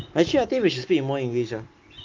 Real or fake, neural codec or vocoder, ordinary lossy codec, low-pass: real; none; Opus, 32 kbps; 7.2 kHz